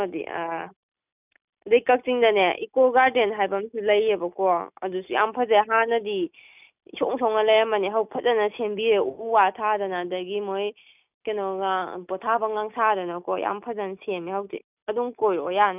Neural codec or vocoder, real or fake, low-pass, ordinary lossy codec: none; real; 3.6 kHz; none